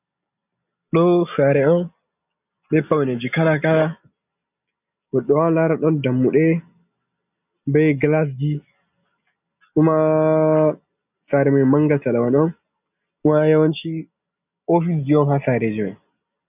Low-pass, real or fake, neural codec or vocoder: 3.6 kHz; real; none